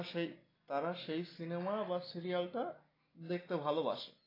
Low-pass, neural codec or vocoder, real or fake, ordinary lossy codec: 5.4 kHz; none; real; AAC, 24 kbps